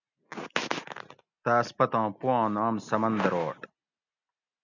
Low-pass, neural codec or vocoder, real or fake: 7.2 kHz; none; real